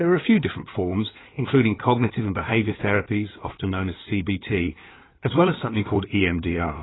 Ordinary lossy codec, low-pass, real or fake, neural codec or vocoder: AAC, 16 kbps; 7.2 kHz; fake; codec, 16 kHz in and 24 kHz out, 2.2 kbps, FireRedTTS-2 codec